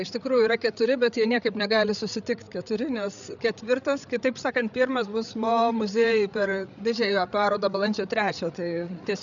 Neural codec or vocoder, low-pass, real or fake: codec, 16 kHz, 8 kbps, FreqCodec, larger model; 7.2 kHz; fake